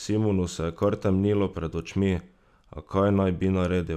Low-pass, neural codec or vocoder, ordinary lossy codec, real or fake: 14.4 kHz; none; none; real